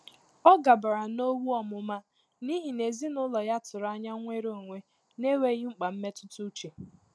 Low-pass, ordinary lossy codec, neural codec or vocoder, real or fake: none; none; none; real